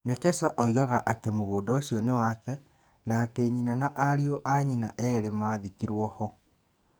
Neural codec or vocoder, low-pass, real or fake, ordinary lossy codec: codec, 44.1 kHz, 2.6 kbps, SNAC; none; fake; none